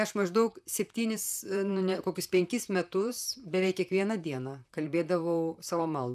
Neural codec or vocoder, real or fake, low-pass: vocoder, 44.1 kHz, 128 mel bands, Pupu-Vocoder; fake; 14.4 kHz